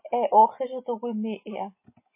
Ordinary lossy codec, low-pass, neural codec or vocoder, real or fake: MP3, 24 kbps; 3.6 kHz; none; real